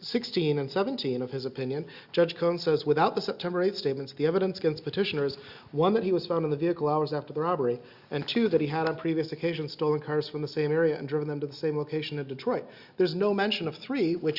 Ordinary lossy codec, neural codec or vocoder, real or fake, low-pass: Opus, 64 kbps; none; real; 5.4 kHz